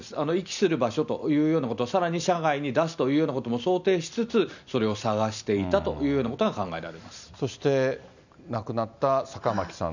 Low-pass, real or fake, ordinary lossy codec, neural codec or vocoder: 7.2 kHz; real; none; none